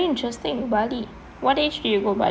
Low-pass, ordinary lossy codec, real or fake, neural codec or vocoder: none; none; real; none